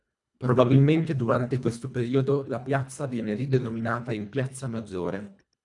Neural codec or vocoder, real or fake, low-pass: codec, 24 kHz, 1.5 kbps, HILCodec; fake; 10.8 kHz